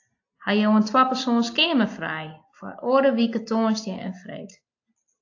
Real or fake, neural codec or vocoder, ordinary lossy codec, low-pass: real; none; AAC, 48 kbps; 7.2 kHz